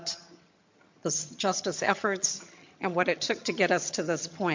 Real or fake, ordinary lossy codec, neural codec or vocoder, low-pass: fake; MP3, 48 kbps; vocoder, 22.05 kHz, 80 mel bands, HiFi-GAN; 7.2 kHz